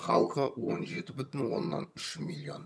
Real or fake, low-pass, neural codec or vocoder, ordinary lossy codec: fake; none; vocoder, 22.05 kHz, 80 mel bands, HiFi-GAN; none